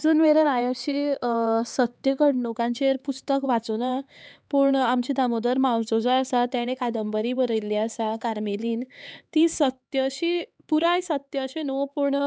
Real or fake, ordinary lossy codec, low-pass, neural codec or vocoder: fake; none; none; codec, 16 kHz, 4 kbps, X-Codec, HuBERT features, trained on LibriSpeech